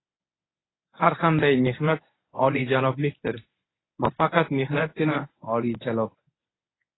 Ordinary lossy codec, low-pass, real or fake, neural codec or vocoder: AAC, 16 kbps; 7.2 kHz; fake; codec, 24 kHz, 0.9 kbps, WavTokenizer, medium speech release version 1